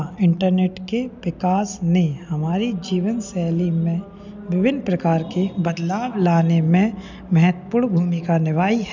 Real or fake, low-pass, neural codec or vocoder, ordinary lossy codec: real; 7.2 kHz; none; none